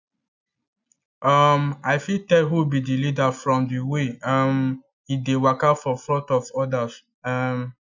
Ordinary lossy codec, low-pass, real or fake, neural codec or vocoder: none; 7.2 kHz; real; none